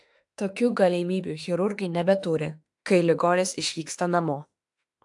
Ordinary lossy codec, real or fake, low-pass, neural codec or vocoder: AAC, 64 kbps; fake; 10.8 kHz; autoencoder, 48 kHz, 32 numbers a frame, DAC-VAE, trained on Japanese speech